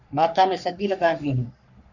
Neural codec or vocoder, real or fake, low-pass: codec, 44.1 kHz, 3.4 kbps, Pupu-Codec; fake; 7.2 kHz